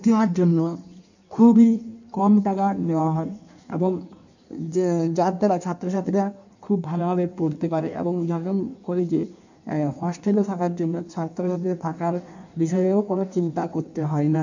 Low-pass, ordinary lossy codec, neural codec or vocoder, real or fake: 7.2 kHz; none; codec, 16 kHz in and 24 kHz out, 1.1 kbps, FireRedTTS-2 codec; fake